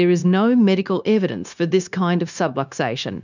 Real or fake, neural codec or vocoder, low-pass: fake; codec, 16 kHz, 0.9 kbps, LongCat-Audio-Codec; 7.2 kHz